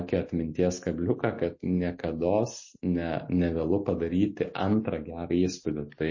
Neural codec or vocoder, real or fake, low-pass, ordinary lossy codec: none; real; 7.2 kHz; MP3, 32 kbps